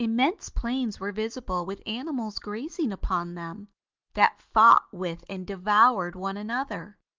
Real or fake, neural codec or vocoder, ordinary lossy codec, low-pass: real; none; Opus, 32 kbps; 7.2 kHz